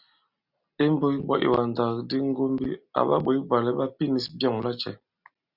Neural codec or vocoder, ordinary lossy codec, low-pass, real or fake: none; Opus, 64 kbps; 5.4 kHz; real